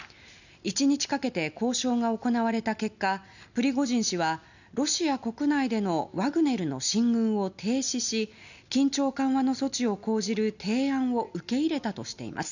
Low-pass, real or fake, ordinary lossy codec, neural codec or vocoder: 7.2 kHz; real; none; none